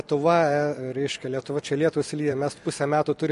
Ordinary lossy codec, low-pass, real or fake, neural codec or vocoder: MP3, 48 kbps; 14.4 kHz; fake; vocoder, 44.1 kHz, 128 mel bands every 256 samples, BigVGAN v2